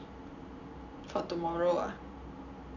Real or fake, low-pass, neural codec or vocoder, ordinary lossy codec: real; 7.2 kHz; none; none